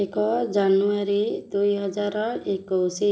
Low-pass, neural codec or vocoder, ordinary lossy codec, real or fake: none; none; none; real